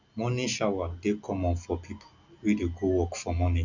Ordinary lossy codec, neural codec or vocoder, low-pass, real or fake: none; none; 7.2 kHz; real